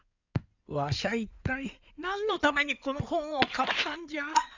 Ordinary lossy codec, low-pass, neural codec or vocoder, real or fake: none; 7.2 kHz; codec, 16 kHz in and 24 kHz out, 2.2 kbps, FireRedTTS-2 codec; fake